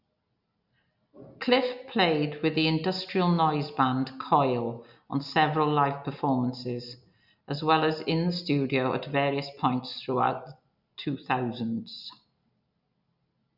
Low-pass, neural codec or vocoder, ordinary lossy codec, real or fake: 5.4 kHz; none; none; real